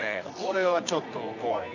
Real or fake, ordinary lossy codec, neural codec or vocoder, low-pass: fake; none; codec, 24 kHz, 0.9 kbps, WavTokenizer, medium music audio release; 7.2 kHz